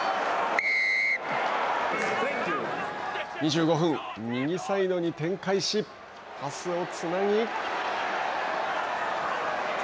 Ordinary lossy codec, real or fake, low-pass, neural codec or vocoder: none; real; none; none